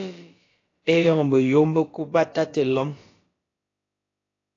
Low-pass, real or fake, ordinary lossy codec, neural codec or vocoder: 7.2 kHz; fake; AAC, 32 kbps; codec, 16 kHz, about 1 kbps, DyCAST, with the encoder's durations